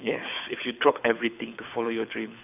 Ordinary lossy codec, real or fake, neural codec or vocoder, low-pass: none; fake; codec, 24 kHz, 6 kbps, HILCodec; 3.6 kHz